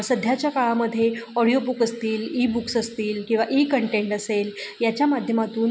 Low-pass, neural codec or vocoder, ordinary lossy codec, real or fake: none; none; none; real